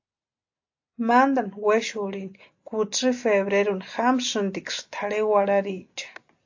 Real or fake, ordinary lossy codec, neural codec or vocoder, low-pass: real; AAC, 48 kbps; none; 7.2 kHz